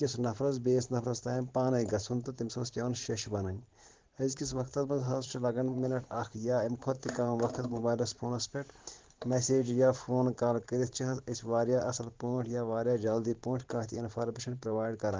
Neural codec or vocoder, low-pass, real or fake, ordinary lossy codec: vocoder, 44.1 kHz, 128 mel bands every 512 samples, BigVGAN v2; 7.2 kHz; fake; Opus, 16 kbps